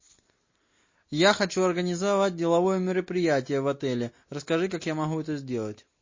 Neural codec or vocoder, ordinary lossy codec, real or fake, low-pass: none; MP3, 32 kbps; real; 7.2 kHz